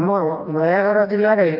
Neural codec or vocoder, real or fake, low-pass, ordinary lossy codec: codec, 16 kHz, 1 kbps, FreqCodec, smaller model; fake; 5.4 kHz; none